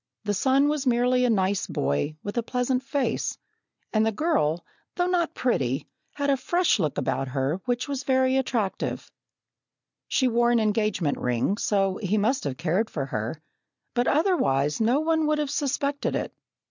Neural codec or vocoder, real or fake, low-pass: none; real; 7.2 kHz